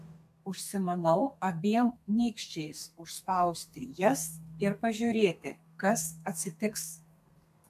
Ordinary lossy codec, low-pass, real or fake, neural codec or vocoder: AAC, 96 kbps; 14.4 kHz; fake; codec, 32 kHz, 1.9 kbps, SNAC